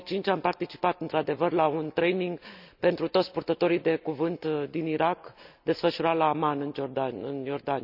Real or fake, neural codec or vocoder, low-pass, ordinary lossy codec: real; none; 5.4 kHz; none